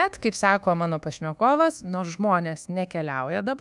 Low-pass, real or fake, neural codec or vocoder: 10.8 kHz; fake; codec, 24 kHz, 1.2 kbps, DualCodec